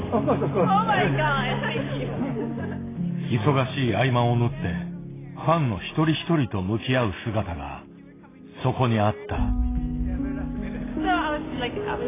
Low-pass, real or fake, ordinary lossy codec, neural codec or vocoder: 3.6 kHz; real; AAC, 16 kbps; none